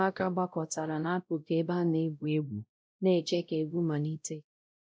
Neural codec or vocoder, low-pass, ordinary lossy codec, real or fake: codec, 16 kHz, 0.5 kbps, X-Codec, WavLM features, trained on Multilingual LibriSpeech; none; none; fake